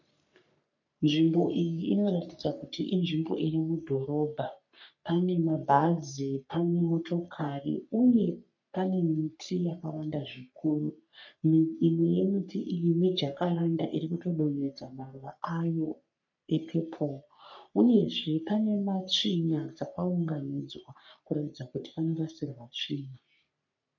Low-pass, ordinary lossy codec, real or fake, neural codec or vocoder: 7.2 kHz; MP3, 64 kbps; fake; codec, 44.1 kHz, 3.4 kbps, Pupu-Codec